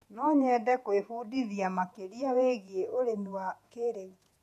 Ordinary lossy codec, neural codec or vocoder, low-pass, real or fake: none; vocoder, 48 kHz, 128 mel bands, Vocos; 14.4 kHz; fake